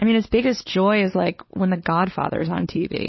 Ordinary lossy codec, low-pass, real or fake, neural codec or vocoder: MP3, 24 kbps; 7.2 kHz; fake; codec, 16 kHz, 4 kbps, X-Codec, WavLM features, trained on Multilingual LibriSpeech